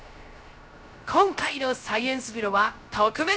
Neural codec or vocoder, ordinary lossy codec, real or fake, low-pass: codec, 16 kHz, 0.3 kbps, FocalCodec; none; fake; none